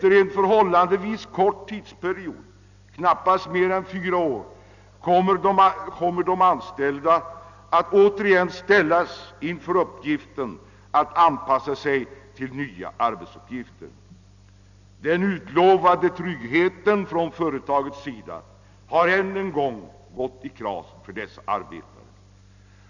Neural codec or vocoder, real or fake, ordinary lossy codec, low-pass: none; real; none; 7.2 kHz